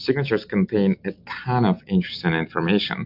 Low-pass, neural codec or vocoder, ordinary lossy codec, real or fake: 5.4 kHz; none; MP3, 48 kbps; real